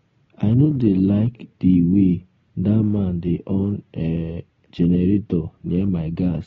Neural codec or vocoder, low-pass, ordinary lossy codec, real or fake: none; 7.2 kHz; AAC, 24 kbps; real